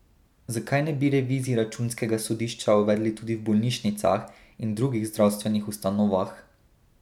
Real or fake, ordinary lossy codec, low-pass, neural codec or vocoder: real; none; 19.8 kHz; none